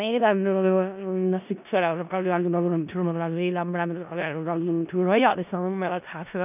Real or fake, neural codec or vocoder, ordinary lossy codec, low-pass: fake; codec, 16 kHz in and 24 kHz out, 0.4 kbps, LongCat-Audio-Codec, four codebook decoder; none; 3.6 kHz